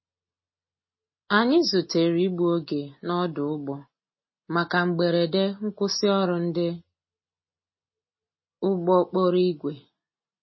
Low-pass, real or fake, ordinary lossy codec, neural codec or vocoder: 7.2 kHz; real; MP3, 24 kbps; none